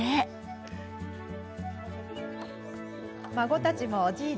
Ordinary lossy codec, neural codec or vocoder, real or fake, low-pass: none; none; real; none